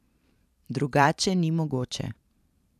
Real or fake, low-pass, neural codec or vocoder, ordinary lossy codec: fake; 14.4 kHz; vocoder, 44.1 kHz, 128 mel bands every 512 samples, BigVGAN v2; none